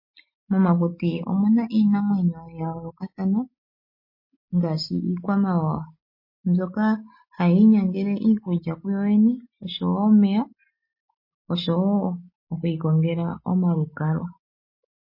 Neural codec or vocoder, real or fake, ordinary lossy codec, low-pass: none; real; MP3, 24 kbps; 5.4 kHz